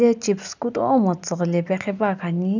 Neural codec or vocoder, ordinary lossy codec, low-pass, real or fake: none; none; 7.2 kHz; real